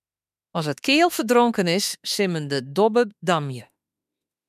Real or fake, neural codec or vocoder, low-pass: fake; autoencoder, 48 kHz, 32 numbers a frame, DAC-VAE, trained on Japanese speech; 14.4 kHz